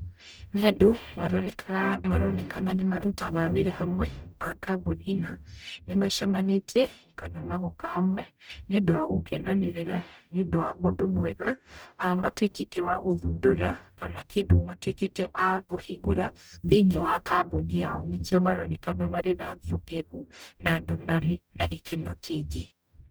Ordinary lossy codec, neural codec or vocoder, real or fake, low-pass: none; codec, 44.1 kHz, 0.9 kbps, DAC; fake; none